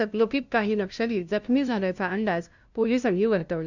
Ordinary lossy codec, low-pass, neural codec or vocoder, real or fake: none; 7.2 kHz; codec, 16 kHz, 0.5 kbps, FunCodec, trained on LibriTTS, 25 frames a second; fake